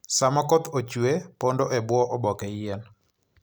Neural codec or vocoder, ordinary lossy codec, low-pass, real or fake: none; none; none; real